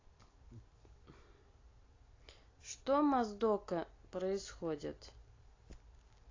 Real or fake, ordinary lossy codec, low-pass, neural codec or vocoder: real; AAC, 32 kbps; 7.2 kHz; none